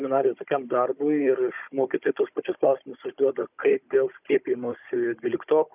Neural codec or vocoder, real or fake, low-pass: codec, 16 kHz, 16 kbps, FunCodec, trained on Chinese and English, 50 frames a second; fake; 3.6 kHz